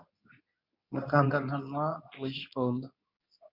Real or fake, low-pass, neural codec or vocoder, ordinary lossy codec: fake; 5.4 kHz; codec, 24 kHz, 0.9 kbps, WavTokenizer, medium speech release version 2; MP3, 48 kbps